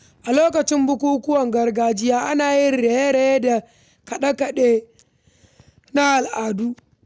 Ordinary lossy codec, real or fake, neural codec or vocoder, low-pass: none; real; none; none